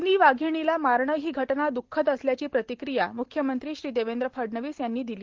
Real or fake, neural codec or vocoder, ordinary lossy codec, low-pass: real; none; Opus, 16 kbps; 7.2 kHz